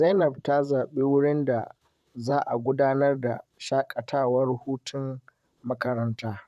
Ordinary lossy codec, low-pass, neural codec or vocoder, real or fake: none; 14.4 kHz; vocoder, 44.1 kHz, 128 mel bands, Pupu-Vocoder; fake